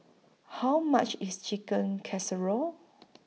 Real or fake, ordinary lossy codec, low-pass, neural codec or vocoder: real; none; none; none